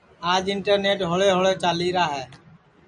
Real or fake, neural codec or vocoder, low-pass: real; none; 9.9 kHz